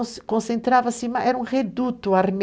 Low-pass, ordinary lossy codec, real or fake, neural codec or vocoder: none; none; real; none